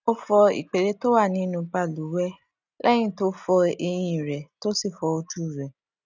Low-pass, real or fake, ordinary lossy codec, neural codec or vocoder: 7.2 kHz; real; none; none